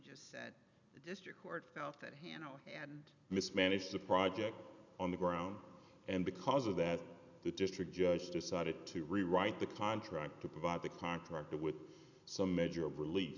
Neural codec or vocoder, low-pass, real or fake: none; 7.2 kHz; real